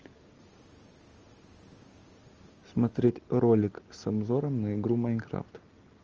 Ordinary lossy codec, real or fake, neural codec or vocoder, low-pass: Opus, 24 kbps; real; none; 7.2 kHz